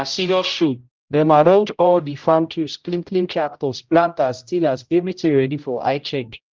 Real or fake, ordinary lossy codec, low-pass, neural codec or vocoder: fake; Opus, 24 kbps; 7.2 kHz; codec, 16 kHz, 0.5 kbps, X-Codec, HuBERT features, trained on general audio